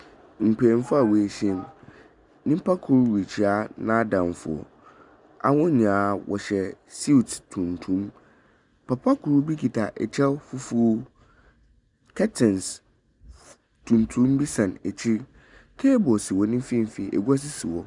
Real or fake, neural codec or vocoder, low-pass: real; none; 10.8 kHz